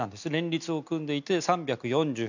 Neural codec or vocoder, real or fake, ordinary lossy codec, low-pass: none; real; none; 7.2 kHz